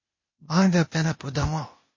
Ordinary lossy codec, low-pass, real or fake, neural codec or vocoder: MP3, 32 kbps; 7.2 kHz; fake; codec, 16 kHz, 0.8 kbps, ZipCodec